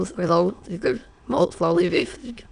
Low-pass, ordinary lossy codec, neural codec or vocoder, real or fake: 9.9 kHz; none; autoencoder, 22.05 kHz, a latent of 192 numbers a frame, VITS, trained on many speakers; fake